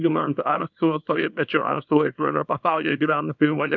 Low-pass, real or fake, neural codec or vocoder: 7.2 kHz; fake; codec, 24 kHz, 0.9 kbps, WavTokenizer, small release